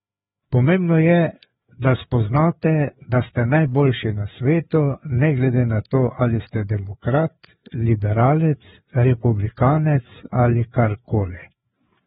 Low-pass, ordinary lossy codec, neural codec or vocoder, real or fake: 7.2 kHz; AAC, 16 kbps; codec, 16 kHz, 4 kbps, FreqCodec, larger model; fake